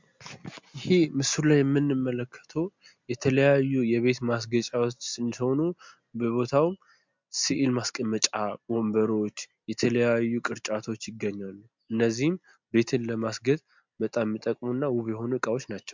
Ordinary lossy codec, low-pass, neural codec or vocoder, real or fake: MP3, 64 kbps; 7.2 kHz; none; real